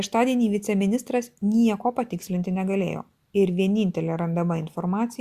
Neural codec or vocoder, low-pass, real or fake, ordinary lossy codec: none; 14.4 kHz; real; Opus, 64 kbps